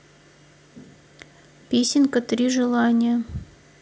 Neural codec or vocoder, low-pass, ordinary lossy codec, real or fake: none; none; none; real